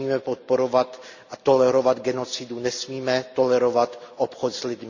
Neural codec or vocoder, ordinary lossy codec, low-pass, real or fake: none; Opus, 64 kbps; 7.2 kHz; real